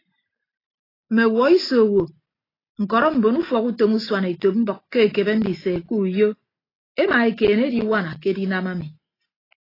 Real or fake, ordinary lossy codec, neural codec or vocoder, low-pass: real; AAC, 24 kbps; none; 5.4 kHz